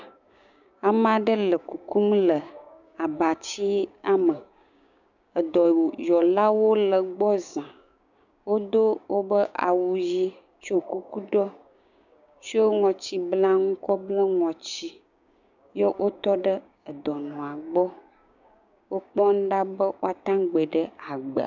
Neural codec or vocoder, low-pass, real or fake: codec, 16 kHz, 6 kbps, DAC; 7.2 kHz; fake